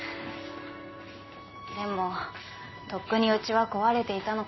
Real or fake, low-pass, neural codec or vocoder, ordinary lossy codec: real; 7.2 kHz; none; MP3, 24 kbps